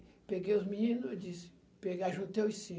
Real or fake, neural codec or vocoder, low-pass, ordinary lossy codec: real; none; none; none